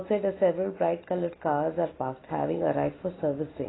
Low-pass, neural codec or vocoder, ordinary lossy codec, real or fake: 7.2 kHz; none; AAC, 16 kbps; real